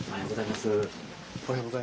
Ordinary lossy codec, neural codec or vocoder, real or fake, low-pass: none; none; real; none